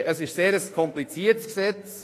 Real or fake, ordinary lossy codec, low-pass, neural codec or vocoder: fake; AAC, 48 kbps; 14.4 kHz; autoencoder, 48 kHz, 32 numbers a frame, DAC-VAE, trained on Japanese speech